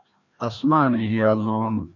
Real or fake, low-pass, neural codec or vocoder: fake; 7.2 kHz; codec, 16 kHz, 1 kbps, FreqCodec, larger model